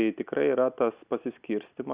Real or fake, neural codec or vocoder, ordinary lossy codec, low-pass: real; none; Opus, 64 kbps; 3.6 kHz